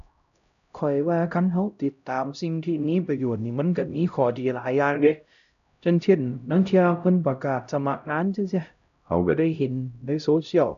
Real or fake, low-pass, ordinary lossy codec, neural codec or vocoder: fake; 7.2 kHz; none; codec, 16 kHz, 0.5 kbps, X-Codec, HuBERT features, trained on LibriSpeech